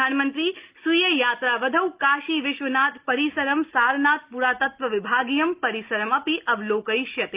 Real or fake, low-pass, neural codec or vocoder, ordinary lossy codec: real; 3.6 kHz; none; Opus, 32 kbps